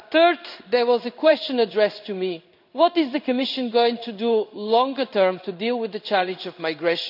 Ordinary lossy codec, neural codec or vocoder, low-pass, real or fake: none; codec, 16 kHz in and 24 kHz out, 1 kbps, XY-Tokenizer; 5.4 kHz; fake